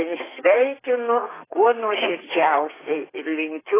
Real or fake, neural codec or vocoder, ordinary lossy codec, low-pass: fake; codec, 32 kHz, 1.9 kbps, SNAC; AAC, 16 kbps; 3.6 kHz